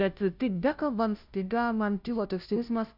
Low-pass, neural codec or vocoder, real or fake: 5.4 kHz; codec, 16 kHz, 0.5 kbps, FunCodec, trained on Chinese and English, 25 frames a second; fake